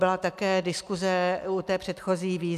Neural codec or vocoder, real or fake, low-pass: none; real; 14.4 kHz